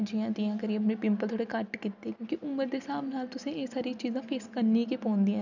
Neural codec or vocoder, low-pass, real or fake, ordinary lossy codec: none; 7.2 kHz; real; none